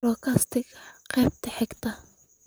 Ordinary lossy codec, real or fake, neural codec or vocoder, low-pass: none; fake; vocoder, 44.1 kHz, 128 mel bands, Pupu-Vocoder; none